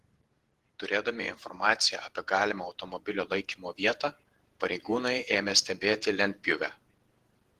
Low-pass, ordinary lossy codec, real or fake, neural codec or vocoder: 14.4 kHz; Opus, 16 kbps; fake; vocoder, 48 kHz, 128 mel bands, Vocos